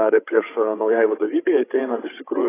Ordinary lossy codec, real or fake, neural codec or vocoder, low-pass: AAC, 16 kbps; fake; codec, 16 kHz, 16 kbps, FreqCodec, larger model; 3.6 kHz